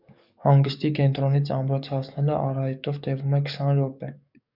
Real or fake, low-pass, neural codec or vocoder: fake; 5.4 kHz; codec, 44.1 kHz, 7.8 kbps, DAC